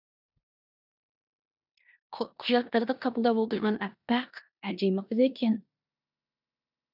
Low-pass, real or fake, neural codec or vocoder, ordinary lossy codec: 5.4 kHz; fake; codec, 16 kHz in and 24 kHz out, 0.9 kbps, LongCat-Audio-Codec, four codebook decoder; none